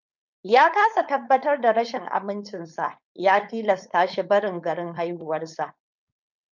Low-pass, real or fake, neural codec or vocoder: 7.2 kHz; fake; codec, 16 kHz, 4.8 kbps, FACodec